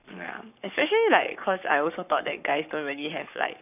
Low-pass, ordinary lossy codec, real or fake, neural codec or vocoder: 3.6 kHz; none; fake; codec, 44.1 kHz, 7.8 kbps, Pupu-Codec